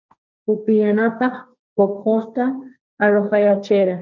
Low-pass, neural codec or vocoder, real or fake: 7.2 kHz; codec, 16 kHz, 1.1 kbps, Voila-Tokenizer; fake